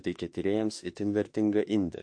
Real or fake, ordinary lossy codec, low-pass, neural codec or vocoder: fake; MP3, 48 kbps; 9.9 kHz; autoencoder, 48 kHz, 32 numbers a frame, DAC-VAE, trained on Japanese speech